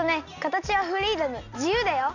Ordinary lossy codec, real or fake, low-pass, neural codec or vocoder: none; real; 7.2 kHz; none